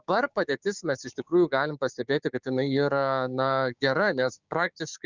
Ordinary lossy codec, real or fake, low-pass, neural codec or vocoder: Opus, 64 kbps; fake; 7.2 kHz; codec, 16 kHz, 6 kbps, DAC